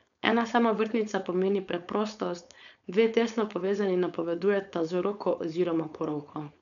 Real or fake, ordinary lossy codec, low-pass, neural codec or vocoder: fake; none; 7.2 kHz; codec, 16 kHz, 4.8 kbps, FACodec